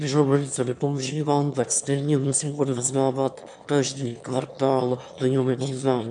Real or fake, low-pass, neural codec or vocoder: fake; 9.9 kHz; autoencoder, 22.05 kHz, a latent of 192 numbers a frame, VITS, trained on one speaker